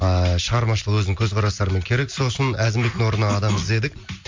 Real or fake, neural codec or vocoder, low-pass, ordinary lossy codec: real; none; 7.2 kHz; MP3, 48 kbps